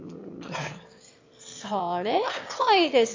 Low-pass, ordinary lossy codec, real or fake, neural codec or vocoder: 7.2 kHz; MP3, 48 kbps; fake; autoencoder, 22.05 kHz, a latent of 192 numbers a frame, VITS, trained on one speaker